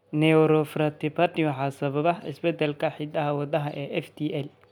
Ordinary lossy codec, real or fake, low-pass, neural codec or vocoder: none; real; 19.8 kHz; none